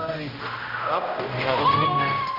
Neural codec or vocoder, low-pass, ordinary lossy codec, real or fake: codec, 16 kHz, 0.5 kbps, X-Codec, HuBERT features, trained on general audio; 5.4 kHz; none; fake